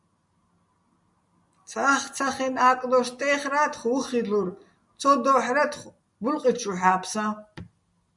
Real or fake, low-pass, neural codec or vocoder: real; 10.8 kHz; none